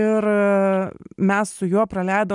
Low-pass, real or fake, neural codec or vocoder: 10.8 kHz; real; none